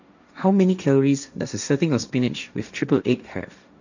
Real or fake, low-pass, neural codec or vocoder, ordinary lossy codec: fake; 7.2 kHz; codec, 16 kHz, 1.1 kbps, Voila-Tokenizer; none